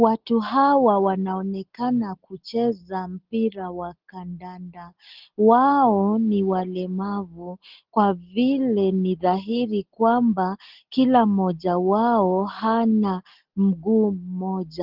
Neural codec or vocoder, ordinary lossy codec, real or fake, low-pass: none; Opus, 16 kbps; real; 5.4 kHz